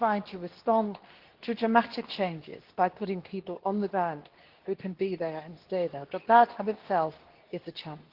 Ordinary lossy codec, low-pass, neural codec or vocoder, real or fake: Opus, 16 kbps; 5.4 kHz; codec, 24 kHz, 0.9 kbps, WavTokenizer, medium speech release version 2; fake